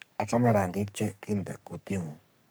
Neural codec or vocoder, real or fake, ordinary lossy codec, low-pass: codec, 44.1 kHz, 3.4 kbps, Pupu-Codec; fake; none; none